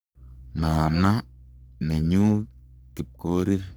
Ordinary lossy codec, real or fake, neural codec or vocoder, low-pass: none; fake; codec, 44.1 kHz, 3.4 kbps, Pupu-Codec; none